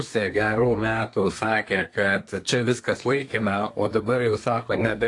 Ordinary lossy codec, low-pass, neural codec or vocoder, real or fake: AAC, 48 kbps; 10.8 kHz; codec, 24 kHz, 1 kbps, SNAC; fake